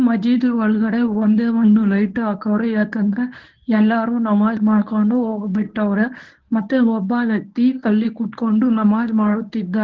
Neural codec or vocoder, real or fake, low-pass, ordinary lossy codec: codec, 24 kHz, 0.9 kbps, WavTokenizer, medium speech release version 1; fake; 7.2 kHz; Opus, 16 kbps